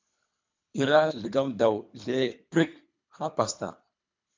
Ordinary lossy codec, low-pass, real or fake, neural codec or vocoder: MP3, 64 kbps; 7.2 kHz; fake; codec, 24 kHz, 3 kbps, HILCodec